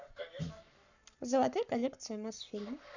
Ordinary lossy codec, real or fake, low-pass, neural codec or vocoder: none; fake; 7.2 kHz; codec, 44.1 kHz, 7.8 kbps, Pupu-Codec